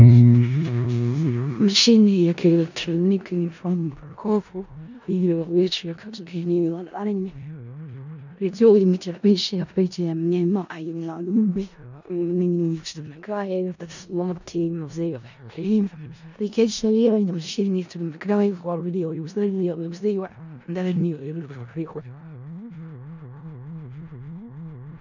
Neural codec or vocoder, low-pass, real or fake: codec, 16 kHz in and 24 kHz out, 0.4 kbps, LongCat-Audio-Codec, four codebook decoder; 7.2 kHz; fake